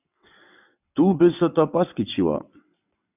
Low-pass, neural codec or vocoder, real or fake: 3.6 kHz; vocoder, 22.05 kHz, 80 mel bands, WaveNeXt; fake